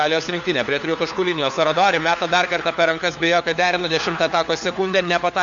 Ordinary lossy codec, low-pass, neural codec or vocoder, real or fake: MP3, 64 kbps; 7.2 kHz; codec, 16 kHz, 4 kbps, FunCodec, trained on LibriTTS, 50 frames a second; fake